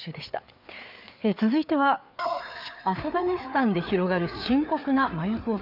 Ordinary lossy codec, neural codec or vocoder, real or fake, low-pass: none; codec, 16 kHz, 4 kbps, FreqCodec, larger model; fake; 5.4 kHz